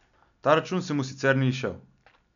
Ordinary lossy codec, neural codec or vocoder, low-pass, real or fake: none; none; 7.2 kHz; real